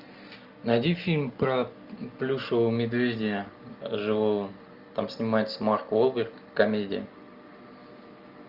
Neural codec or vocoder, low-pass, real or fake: none; 5.4 kHz; real